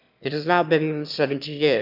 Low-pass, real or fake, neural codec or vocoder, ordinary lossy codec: 5.4 kHz; fake; autoencoder, 22.05 kHz, a latent of 192 numbers a frame, VITS, trained on one speaker; none